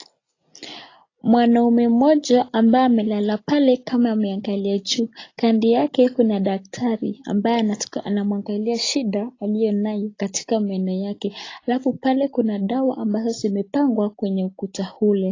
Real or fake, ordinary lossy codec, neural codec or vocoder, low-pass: real; AAC, 32 kbps; none; 7.2 kHz